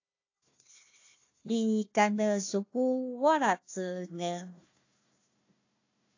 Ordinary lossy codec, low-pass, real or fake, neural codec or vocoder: AAC, 48 kbps; 7.2 kHz; fake; codec, 16 kHz, 1 kbps, FunCodec, trained on Chinese and English, 50 frames a second